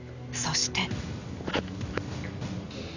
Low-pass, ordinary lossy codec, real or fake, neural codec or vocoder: 7.2 kHz; none; real; none